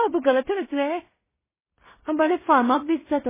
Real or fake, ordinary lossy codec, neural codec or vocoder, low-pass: fake; MP3, 16 kbps; codec, 16 kHz in and 24 kHz out, 0.4 kbps, LongCat-Audio-Codec, two codebook decoder; 3.6 kHz